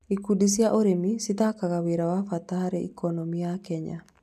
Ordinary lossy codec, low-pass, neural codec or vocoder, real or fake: none; 14.4 kHz; none; real